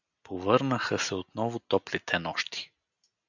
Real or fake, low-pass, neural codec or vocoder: real; 7.2 kHz; none